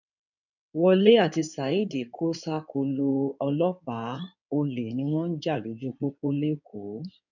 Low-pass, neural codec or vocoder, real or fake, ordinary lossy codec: 7.2 kHz; codec, 16 kHz in and 24 kHz out, 2.2 kbps, FireRedTTS-2 codec; fake; none